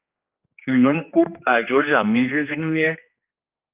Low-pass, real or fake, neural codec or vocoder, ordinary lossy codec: 3.6 kHz; fake; codec, 16 kHz, 2 kbps, X-Codec, HuBERT features, trained on general audio; Opus, 32 kbps